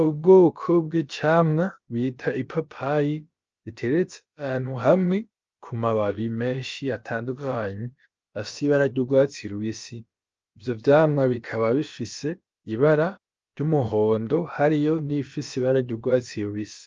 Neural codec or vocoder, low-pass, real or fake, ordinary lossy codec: codec, 16 kHz, about 1 kbps, DyCAST, with the encoder's durations; 7.2 kHz; fake; Opus, 24 kbps